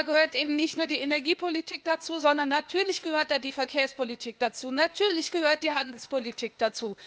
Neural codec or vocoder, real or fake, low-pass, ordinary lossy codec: codec, 16 kHz, 0.8 kbps, ZipCodec; fake; none; none